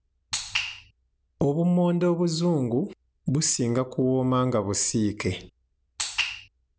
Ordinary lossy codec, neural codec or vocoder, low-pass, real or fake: none; none; none; real